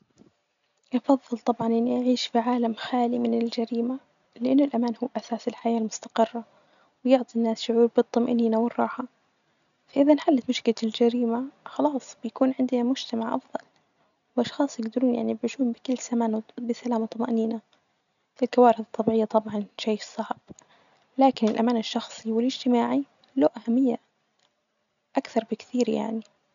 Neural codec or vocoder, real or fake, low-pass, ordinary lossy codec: none; real; 7.2 kHz; none